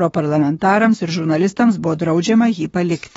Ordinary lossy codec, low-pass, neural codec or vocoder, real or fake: AAC, 24 kbps; 19.8 kHz; autoencoder, 48 kHz, 32 numbers a frame, DAC-VAE, trained on Japanese speech; fake